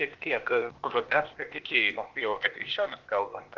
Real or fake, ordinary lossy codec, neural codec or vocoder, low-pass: fake; Opus, 16 kbps; codec, 16 kHz, 0.8 kbps, ZipCodec; 7.2 kHz